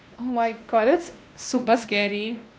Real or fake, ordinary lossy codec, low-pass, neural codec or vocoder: fake; none; none; codec, 16 kHz, 1 kbps, X-Codec, WavLM features, trained on Multilingual LibriSpeech